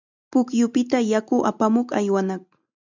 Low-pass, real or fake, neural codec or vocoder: 7.2 kHz; real; none